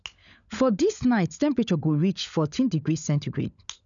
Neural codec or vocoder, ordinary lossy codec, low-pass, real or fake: codec, 16 kHz, 16 kbps, FunCodec, trained on LibriTTS, 50 frames a second; none; 7.2 kHz; fake